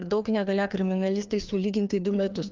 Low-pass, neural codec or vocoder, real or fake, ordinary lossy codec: 7.2 kHz; codec, 16 kHz, 2 kbps, FreqCodec, larger model; fake; Opus, 24 kbps